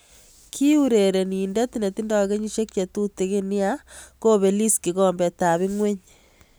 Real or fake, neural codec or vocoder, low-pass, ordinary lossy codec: real; none; none; none